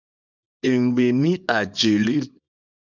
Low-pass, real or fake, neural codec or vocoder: 7.2 kHz; fake; codec, 24 kHz, 0.9 kbps, WavTokenizer, small release